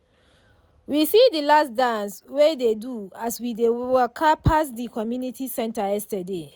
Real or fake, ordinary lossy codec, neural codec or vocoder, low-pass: real; none; none; none